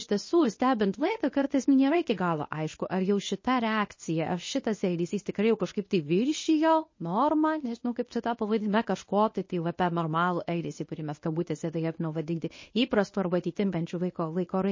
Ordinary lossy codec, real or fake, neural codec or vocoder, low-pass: MP3, 32 kbps; fake; codec, 24 kHz, 0.9 kbps, WavTokenizer, medium speech release version 1; 7.2 kHz